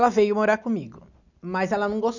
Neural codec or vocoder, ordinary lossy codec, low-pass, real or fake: none; none; 7.2 kHz; real